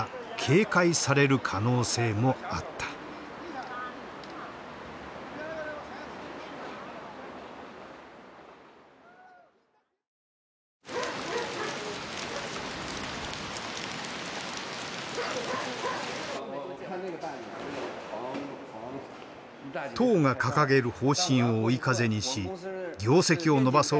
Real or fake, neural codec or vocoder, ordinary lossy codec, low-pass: real; none; none; none